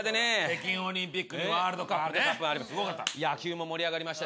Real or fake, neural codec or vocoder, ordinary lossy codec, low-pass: real; none; none; none